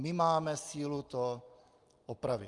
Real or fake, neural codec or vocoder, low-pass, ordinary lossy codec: real; none; 10.8 kHz; Opus, 32 kbps